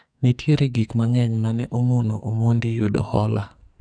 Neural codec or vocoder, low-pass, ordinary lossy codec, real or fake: codec, 44.1 kHz, 2.6 kbps, SNAC; 9.9 kHz; none; fake